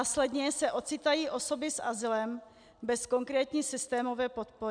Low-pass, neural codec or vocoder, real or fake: 9.9 kHz; none; real